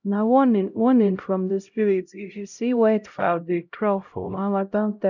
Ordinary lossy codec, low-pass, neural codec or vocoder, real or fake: none; 7.2 kHz; codec, 16 kHz, 0.5 kbps, X-Codec, HuBERT features, trained on LibriSpeech; fake